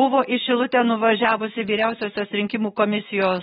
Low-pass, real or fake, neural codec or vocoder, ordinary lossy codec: 19.8 kHz; fake; autoencoder, 48 kHz, 128 numbers a frame, DAC-VAE, trained on Japanese speech; AAC, 16 kbps